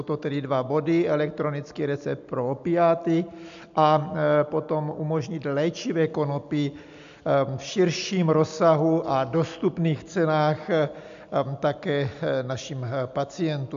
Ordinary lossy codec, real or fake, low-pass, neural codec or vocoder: MP3, 64 kbps; real; 7.2 kHz; none